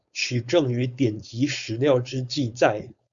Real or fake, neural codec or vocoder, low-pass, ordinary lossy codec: fake; codec, 16 kHz, 4.8 kbps, FACodec; 7.2 kHz; Opus, 64 kbps